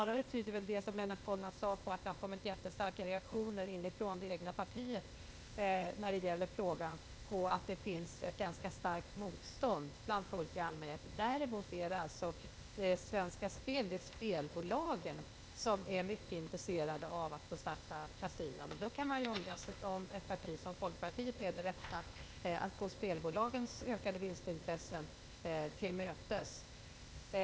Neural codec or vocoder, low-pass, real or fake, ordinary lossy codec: codec, 16 kHz, 0.8 kbps, ZipCodec; none; fake; none